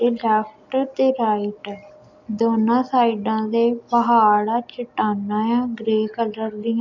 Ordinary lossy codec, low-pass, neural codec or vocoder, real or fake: none; 7.2 kHz; none; real